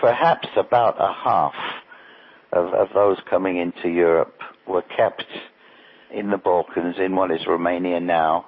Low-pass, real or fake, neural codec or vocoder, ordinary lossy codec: 7.2 kHz; real; none; MP3, 24 kbps